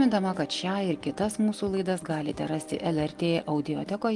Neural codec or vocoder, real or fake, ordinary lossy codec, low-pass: none; real; Opus, 24 kbps; 10.8 kHz